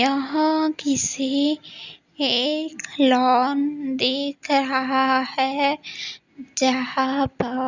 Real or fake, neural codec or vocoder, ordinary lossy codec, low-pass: real; none; Opus, 64 kbps; 7.2 kHz